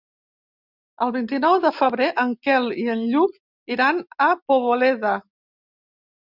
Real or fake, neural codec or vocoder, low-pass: real; none; 5.4 kHz